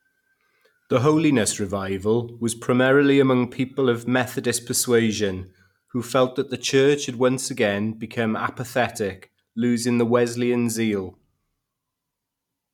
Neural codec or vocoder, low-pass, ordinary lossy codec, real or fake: none; 19.8 kHz; none; real